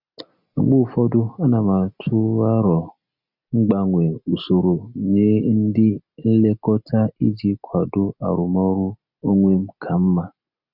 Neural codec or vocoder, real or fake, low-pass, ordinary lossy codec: none; real; 5.4 kHz; none